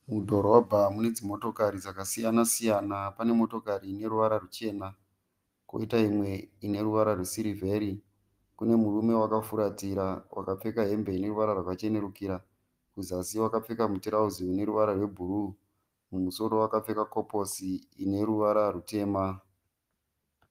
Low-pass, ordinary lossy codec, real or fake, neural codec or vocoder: 14.4 kHz; Opus, 24 kbps; real; none